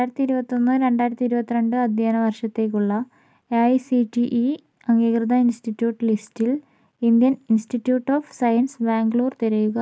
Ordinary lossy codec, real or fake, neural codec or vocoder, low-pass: none; real; none; none